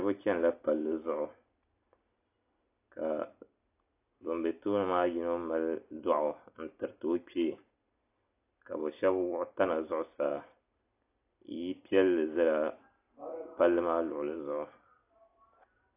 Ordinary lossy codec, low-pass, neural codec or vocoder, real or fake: AAC, 24 kbps; 3.6 kHz; none; real